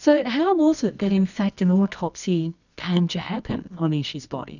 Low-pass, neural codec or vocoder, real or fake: 7.2 kHz; codec, 24 kHz, 0.9 kbps, WavTokenizer, medium music audio release; fake